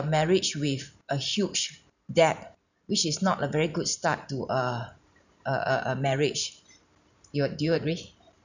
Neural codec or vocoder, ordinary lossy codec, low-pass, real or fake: vocoder, 44.1 kHz, 128 mel bands every 512 samples, BigVGAN v2; none; 7.2 kHz; fake